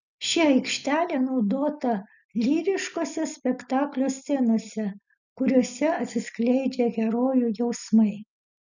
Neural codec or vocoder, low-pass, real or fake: none; 7.2 kHz; real